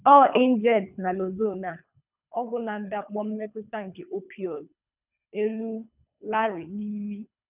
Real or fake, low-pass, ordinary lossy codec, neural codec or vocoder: fake; 3.6 kHz; none; codec, 24 kHz, 3 kbps, HILCodec